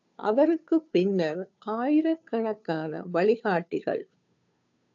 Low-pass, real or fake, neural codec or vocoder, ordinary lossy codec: 7.2 kHz; fake; codec, 16 kHz, 2 kbps, FunCodec, trained on Chinese and English, 25 frames a second; AAC, 64 kbps